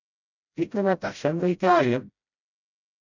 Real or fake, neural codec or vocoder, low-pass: fake; codec, 16 kHz, 0.5 kbps, FreqCodec, smaller model; 7.2 kHz